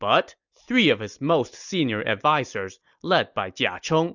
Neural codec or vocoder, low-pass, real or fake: none; 7.2 kHz; real